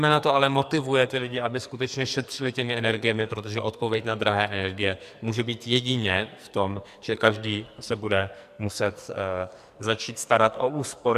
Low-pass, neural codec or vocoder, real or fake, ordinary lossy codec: 14.4 kHz; codec, 44.1 kHz, 2.6 kbps, SNAC; fake; AAC, 96 kbps